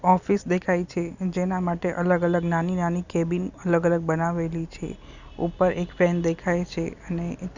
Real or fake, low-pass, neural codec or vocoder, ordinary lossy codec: real; 7.2 kHz; none; none